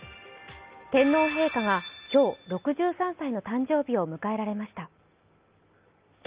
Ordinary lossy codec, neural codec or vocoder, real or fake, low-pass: Opus, 24 kbps; none; real; 3.6 kHz